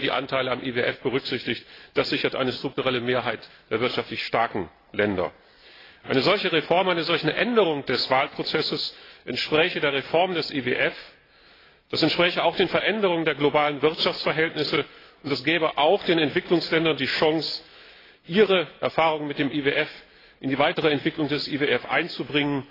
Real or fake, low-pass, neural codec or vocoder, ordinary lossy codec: real; 5.4 kHz; none; AAC, 24 kbps